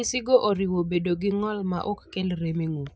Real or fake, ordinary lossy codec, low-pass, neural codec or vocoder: real; none; none; none